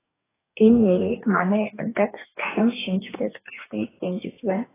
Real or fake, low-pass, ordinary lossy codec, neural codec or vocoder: fake; 3.6 kHz; AAC, 16 kbps; codec, 44.1 kHz, 2.6 kbps, DAC